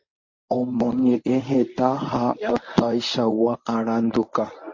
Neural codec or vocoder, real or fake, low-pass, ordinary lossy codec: codec, 24 kHz, 0.9 kbps, WavTokenizer, medium speech release version 1; fake; 7.2 kHz; MP3, 32 kbps